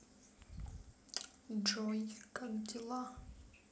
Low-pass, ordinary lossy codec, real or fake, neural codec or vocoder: none; none; real; none